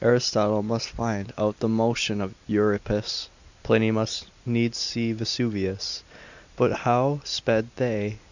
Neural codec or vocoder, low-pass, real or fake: none; 7.2 kHz; real